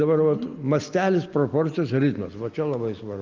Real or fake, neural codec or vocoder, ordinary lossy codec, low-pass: real; none; Opus, 24 kbps; 7.2 kHz